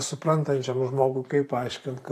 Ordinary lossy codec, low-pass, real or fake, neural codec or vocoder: AAC, 64 kbps; 14.4 kHz; fake; vocoder, 44.1 kHz, 128 mel bands every 512 samples, BigVGAN v2